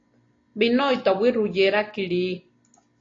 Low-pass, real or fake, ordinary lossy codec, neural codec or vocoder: 7.2 kHz; real; AAC, 32 kbps; none